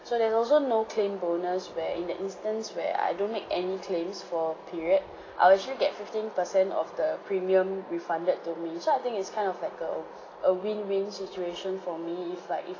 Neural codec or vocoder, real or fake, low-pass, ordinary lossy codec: none; real; 7.2 kHz; AAC, 32 kbps